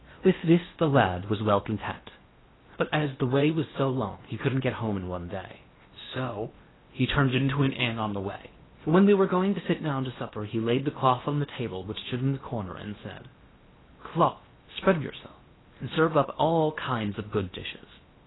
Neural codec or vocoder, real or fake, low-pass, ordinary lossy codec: codec, 16 kHz in and 24 kHz out, 0.8 kbps, FocalCodec, streaming, 65536 codes; fake; 7.2 kHz; AAC, 16 kbps